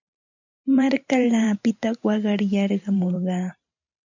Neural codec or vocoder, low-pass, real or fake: vocoder, 44.1 kHz, 128 mel bands every 512 samples, BigVGAN v2; 7.2 kHz; fake